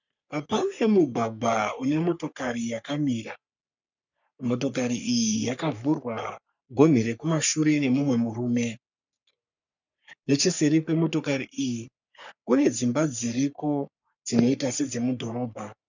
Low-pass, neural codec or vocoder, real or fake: 7.2 kHz; codec, 44.1 kHz, 3.4 kbps, Pupu-Codec; fake